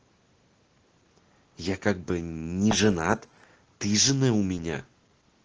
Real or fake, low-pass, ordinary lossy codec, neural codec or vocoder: real; 7.2 kHz; Opus, 16 kbps; none